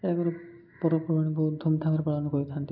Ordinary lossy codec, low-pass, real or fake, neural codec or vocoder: none; 5.4 kHz; real; none